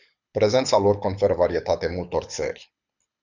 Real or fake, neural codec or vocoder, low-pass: fake; codec, 24 kHz, 6 kbps, HILCodec; 7.2 kHz